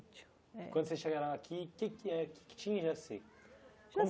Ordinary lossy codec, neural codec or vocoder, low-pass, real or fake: none; none; none; real